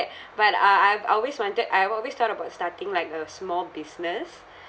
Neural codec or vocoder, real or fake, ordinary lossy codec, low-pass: none; real; none; none